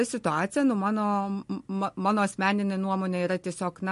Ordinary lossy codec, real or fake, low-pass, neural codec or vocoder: MP3, 48 kbps; real; 14.4 kHz; none